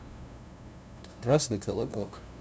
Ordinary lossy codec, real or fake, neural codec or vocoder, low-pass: none; fake; codec, 16 kHz, 0.5 kbps, FunCodec, trained on LibriTTS, 25 frames a second; none